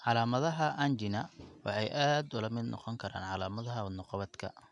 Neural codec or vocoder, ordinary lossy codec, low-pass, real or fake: none; none; 10.8 kHz; real